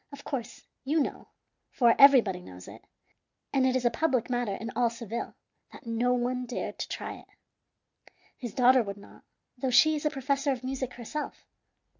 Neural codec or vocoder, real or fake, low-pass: none; real; 7.2 kHz